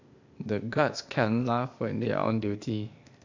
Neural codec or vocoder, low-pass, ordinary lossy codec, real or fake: codec, 16 kHz, 0.8 kbps, ZipCodec; 7.2 kHz; AAC, 48 kbps; fake